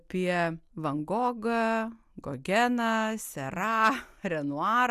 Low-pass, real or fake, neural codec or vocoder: 14.4 kHz; real; none